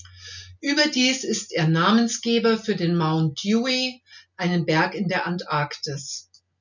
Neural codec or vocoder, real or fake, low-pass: none; real; 7.2 kHz